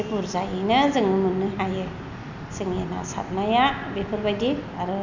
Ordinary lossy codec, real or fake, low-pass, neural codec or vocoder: none; real; 7.2 kHz; none